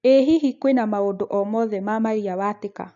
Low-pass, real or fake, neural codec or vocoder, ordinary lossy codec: 7.2 kHz; real; none; none